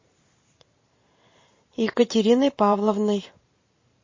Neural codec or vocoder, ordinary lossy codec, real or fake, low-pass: vocoder, 44.1 kHz, 128 mel bands every 512 samples, BigVGAN v2; MP3, 32 kbps; fake; 7.2 kHz